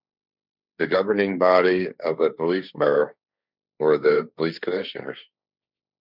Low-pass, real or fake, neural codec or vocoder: 5.4 kHz; fake; codec, 16 kHz, 1.1 kbps, Voila-Tokenizer